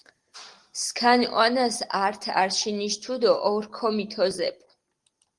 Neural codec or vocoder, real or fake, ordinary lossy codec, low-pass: none; real; Opus, 24 kbps; 10.8 kHz